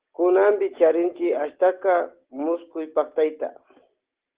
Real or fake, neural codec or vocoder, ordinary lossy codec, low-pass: real; none; Opus, 16 kbps; 3.6 kHz